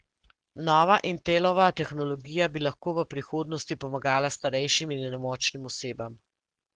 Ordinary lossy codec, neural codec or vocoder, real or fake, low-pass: Opus, 16 kbps; codec, 44.1 kHz, 7.8 kbps, Pupu-Codec; fake; 9.9 kHz